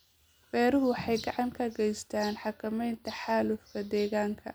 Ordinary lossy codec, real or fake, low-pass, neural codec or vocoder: none; real; none; none